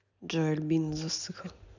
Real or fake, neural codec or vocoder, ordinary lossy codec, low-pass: real; none; none; 7.2 kHz